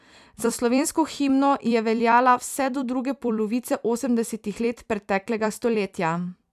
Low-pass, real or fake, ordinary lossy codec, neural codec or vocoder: 14.4 kHz; fake; none; vocoder, 44.1 kHz, 128 mel bands every 256 samples, BigVGAN v2